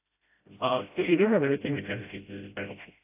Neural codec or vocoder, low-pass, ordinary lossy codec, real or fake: codec, 16 kHz, 0.5 kbps, FreqCodec, smaller model; 3.6 kHz; AAC, 32 kbps; fake